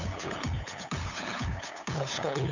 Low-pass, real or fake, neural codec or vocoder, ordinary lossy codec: 7.2 kHz; fake; codec, 16 kHz, 8 kbps, FunCodec, trained on LibriTTS, 25 frames a second; none